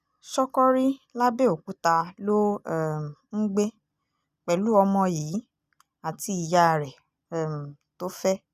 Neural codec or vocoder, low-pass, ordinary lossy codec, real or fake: none; 14.4 kHz; none; real